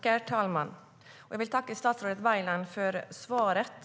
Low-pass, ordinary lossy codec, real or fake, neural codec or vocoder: none; none; real; none